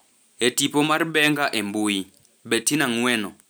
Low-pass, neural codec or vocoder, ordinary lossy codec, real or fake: none; none; none; real